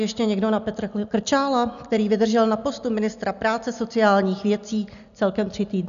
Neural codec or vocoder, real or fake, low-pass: none; real; 7.2 kHz